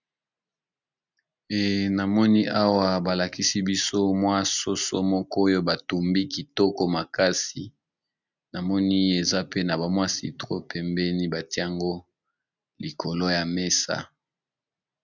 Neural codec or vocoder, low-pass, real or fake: none; 7.2 kHz; real